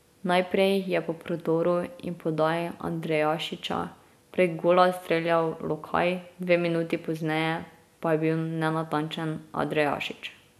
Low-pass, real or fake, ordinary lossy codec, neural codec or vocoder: 14.4 kHz; real; none; none